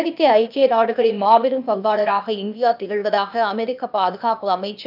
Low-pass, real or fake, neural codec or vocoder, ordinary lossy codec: 5.4 kHz; fake; codec, 16 kHz, 0.8 kbps, ZipCodec; none